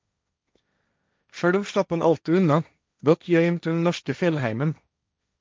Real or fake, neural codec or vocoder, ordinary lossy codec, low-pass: fake; codec, 16 kHz, 1.1 kbps, Voila-Tokenizer; none; none